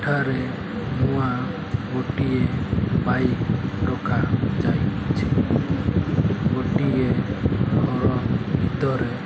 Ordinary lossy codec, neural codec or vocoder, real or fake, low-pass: none; none; real; none